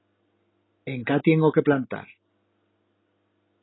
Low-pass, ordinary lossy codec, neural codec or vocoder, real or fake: 7.2 kHz; AAC, 16 kbps; none; real